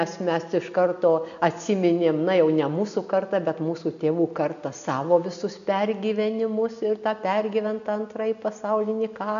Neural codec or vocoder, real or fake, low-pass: none; real; 7.2 kHz